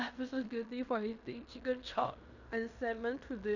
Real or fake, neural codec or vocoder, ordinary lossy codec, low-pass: fake; codec, 16 kHz in and 24 kHz out, 0.9 kbps, LongCat-Audio-Codec, fine tuned four codebook decoder; none; 7.2 kHz